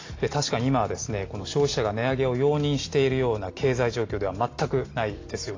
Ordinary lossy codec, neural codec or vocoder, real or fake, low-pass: AAC, 32 kbps; none; real; 7.2 kHz